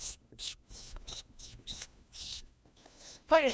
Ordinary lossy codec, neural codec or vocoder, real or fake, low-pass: none; codec, 16 kHz, 1 kbps, FunCodec, trained on LibriTTS, 50 frames a second; fake; none